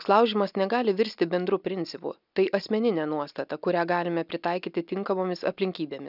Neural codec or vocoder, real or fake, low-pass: none; real; 5.4 kHz